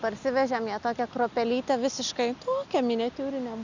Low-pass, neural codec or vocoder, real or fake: 7.2 kHz; none; real